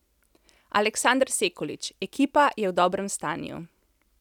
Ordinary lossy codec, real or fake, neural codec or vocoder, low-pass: none; real; none; 19.8 kHz